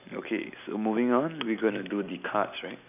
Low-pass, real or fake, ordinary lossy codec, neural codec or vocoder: 3.6 kHz; real; none; none